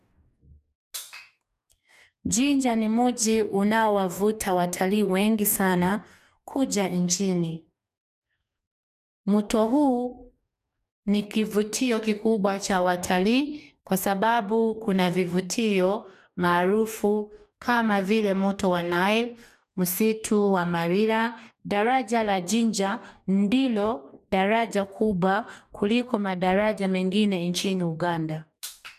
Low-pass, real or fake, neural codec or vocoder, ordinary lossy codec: 14.4 kHz; fake; codec, 44.1 kHz, 2.6 kbps, DAC; none